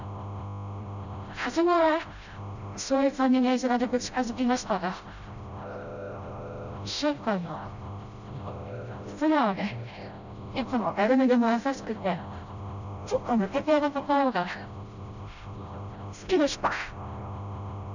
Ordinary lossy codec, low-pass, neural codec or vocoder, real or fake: none; 7.2 kHz; codec, 16 kHz, 0.5 kbps, FreqCodec, smaller model; fake